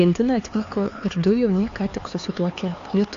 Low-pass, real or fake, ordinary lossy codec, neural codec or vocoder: 7.2 kHz; fake; AAC, 48 kbps; codec, 16 kHz, 4 kbps, X-Codec, HuBERT features, trained on LibriSpeech